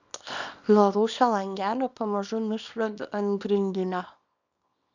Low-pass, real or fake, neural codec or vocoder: 7.2 kHz; fake; codec, 24 kHz, 0.9 kbps, WavTokenizer, small release